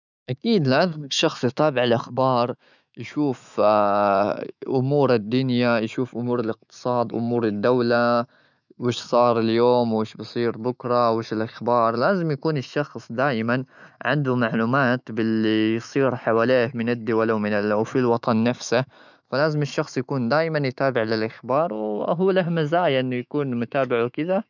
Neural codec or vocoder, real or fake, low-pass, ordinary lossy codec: autoencoder, 48 kHz, 128 numbers a frame, DAC-VAE, trained on Japanese speech; fake; 7.2 kHz; none